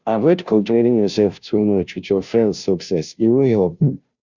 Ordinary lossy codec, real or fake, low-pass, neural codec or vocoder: Opus, 64 kbps; fake; 7.2 kHz; codec, 16 kHz, 0.5 kbps, FunCodec, trained on Chinese and English, 25 frames a second